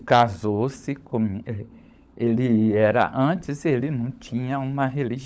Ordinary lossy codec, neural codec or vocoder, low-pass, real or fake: none; codec, 16 kHz, 16 kbps, FunCodec, trained on LibriTTS, 50 frames a second; none; fake